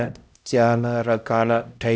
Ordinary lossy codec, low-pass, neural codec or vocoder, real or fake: none; none; codec, 16 kHz, 0.5 kbps, X-Codec, HuBERT features, trained on LibriSpeech; fake